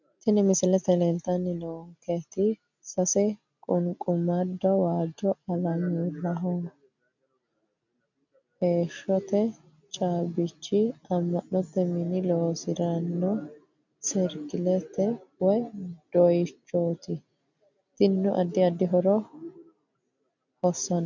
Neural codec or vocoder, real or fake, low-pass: none; real; 7.2 kHz